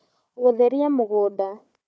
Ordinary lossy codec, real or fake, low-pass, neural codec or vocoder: none; fake; none; codec, 16 kHz, 4 kbps, FunCodec, trained on Chinese and English, 50 frames a second